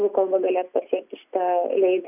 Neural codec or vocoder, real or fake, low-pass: none; real; 3.6 kHz